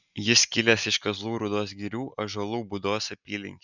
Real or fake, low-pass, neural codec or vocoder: real; 7.2 kHz; none